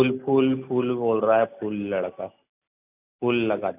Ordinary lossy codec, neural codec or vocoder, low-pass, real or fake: none; none; 3.6 kHz; real